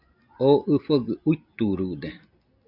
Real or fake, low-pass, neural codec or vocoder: real; 5.4 kHz; none